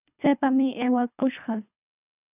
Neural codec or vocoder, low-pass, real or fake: codec, 24 kHz, 3 kbps, HILCodec; 3.6 kHz; fake